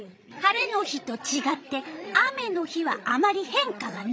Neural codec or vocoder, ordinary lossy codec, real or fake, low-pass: codec, 16 kHz, 16 kbps, FreqCodec, larger model; none; fake; none